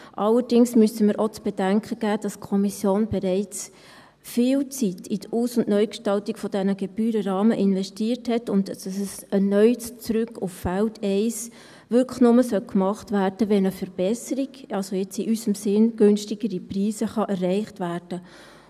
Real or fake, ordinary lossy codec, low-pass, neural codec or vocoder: real; none; 14.4 kHz; none